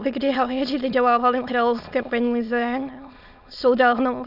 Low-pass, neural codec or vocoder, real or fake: 5.4 kHz; autoencoder, 22.05 kHz, a latent of 192 numbers a frame, VITS, trained on many speakers; fake